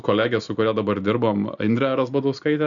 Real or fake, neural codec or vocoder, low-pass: real; none; 7.2 kHz